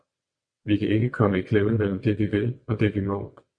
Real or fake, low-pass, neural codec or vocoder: fake; 9.9 kHz; vocoder, 22.05 kHz, 80 mel bands, WaveNeXt